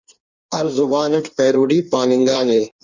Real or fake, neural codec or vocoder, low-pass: fake; codec, 16 kHz in and 24 kHz out, 1.1 kbps, FireRedTTS-2 codec; 7.2 kHz